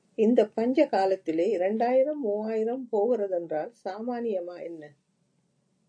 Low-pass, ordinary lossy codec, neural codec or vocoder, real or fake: 9.9 kHz; AAC, 48 kbps; none; real